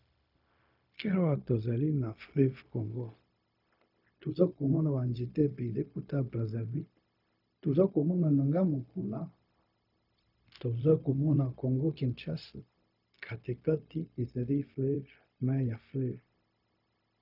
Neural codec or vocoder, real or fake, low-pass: codec, 16 kHz, 0.4 kbps, LongCat-Audio-Codec; fake; 5.4 kHz